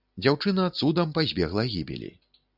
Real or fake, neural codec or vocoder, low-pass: real; none; 5.4 kHz